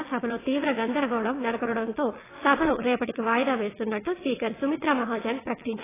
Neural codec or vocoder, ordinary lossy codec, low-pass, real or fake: vocoder, 22.05 kHz, 80 mel bands, WaveNeXt; AAC, 16 kbps; 3.6 kHz; fake